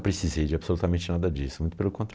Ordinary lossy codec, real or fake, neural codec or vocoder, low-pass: none; real; none; none